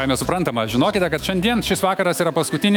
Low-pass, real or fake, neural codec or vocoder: 19.8 kHz; fake; autoencoder, 48 kHz, 128 numbers a frame, DAC-VAE, trained on Japanese speech